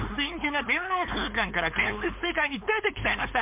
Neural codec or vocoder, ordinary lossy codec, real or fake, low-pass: codec, 16 kHz, 4.8 kbps, FACodec; MP3, 32 kbps; fake; 3.6 kHz